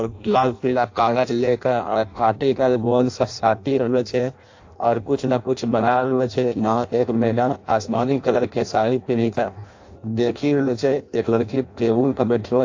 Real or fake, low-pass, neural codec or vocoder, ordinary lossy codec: fake; 7.2 kHz; codec, 16 kHz in and 24 kHz out, 0.6 kbps, FireRedTTS-2 codec; AAC, 48 kbps